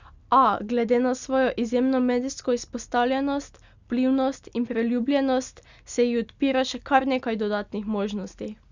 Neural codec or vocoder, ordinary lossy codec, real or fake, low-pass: none; Opus, 64 kbps; real; 7.2 kHz